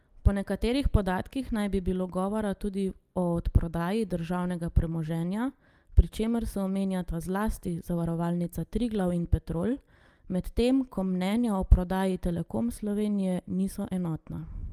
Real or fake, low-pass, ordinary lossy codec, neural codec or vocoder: real; 14.4 kHz; Opus, 32 kbps; none